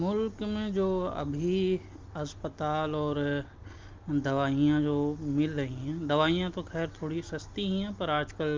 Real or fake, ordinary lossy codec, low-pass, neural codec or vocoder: real; Opus, 32 kbps; 7.2 kHz; none